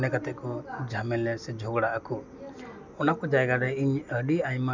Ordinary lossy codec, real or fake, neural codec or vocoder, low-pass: none; real; none; 7.2 kHz